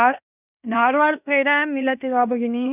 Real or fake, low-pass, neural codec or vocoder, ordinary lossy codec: fake; 3.6 kHz; codec, 16 kHz in and 24 kHz out, 0.9 kbps, LongCat-Audio-Codec, fine tuned four codebook decoder; none